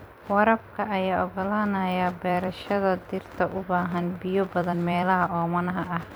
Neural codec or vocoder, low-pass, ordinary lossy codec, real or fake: vocoder, 44.1 kHz, 128 mel bands every 256 samples, BigVGAN v2; none; none; fake